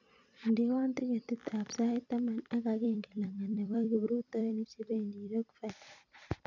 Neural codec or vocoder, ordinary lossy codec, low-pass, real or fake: vocoder, 44.1 kHz, 128 mel bands every 256 samples, BigVGAN v2; none; 7.2 kHz; fake